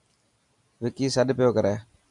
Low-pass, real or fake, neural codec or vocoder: 10.8 kHz; real; none